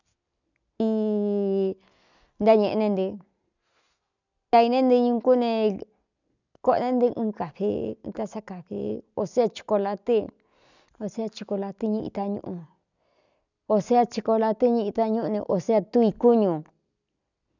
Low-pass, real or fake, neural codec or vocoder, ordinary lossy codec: 7.2 kHz; real; none; none